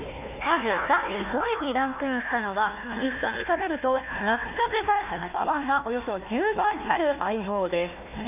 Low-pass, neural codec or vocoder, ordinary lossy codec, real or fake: 3.6 kHz; codec, 16 kHz, 1 kbps, FunCodec, trained on Chinese and English, 50 frames a second; none; fake